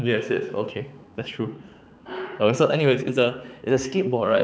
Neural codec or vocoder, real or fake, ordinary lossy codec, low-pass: codec, 16 kHz, 4 kbps, X-Codec, HuBERT features, trained on balanced general audio; fake; none; none